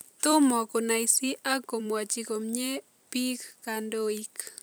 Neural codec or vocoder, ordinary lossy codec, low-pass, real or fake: vocoder, 44.1 kHz, 128 mel bands every 256 samples, BigVGAN v2; none; none; fake